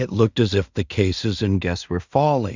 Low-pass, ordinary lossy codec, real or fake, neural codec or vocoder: 7.2 kHz; Opus, 64 kbps; fake; codec, 16 kHz in and 24 kHz out, 0.4 kbps, LongCat-Audio-Codec, two codebook decoder